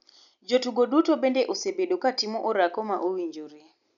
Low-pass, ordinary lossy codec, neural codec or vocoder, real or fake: 7.2 kHz; none; none; real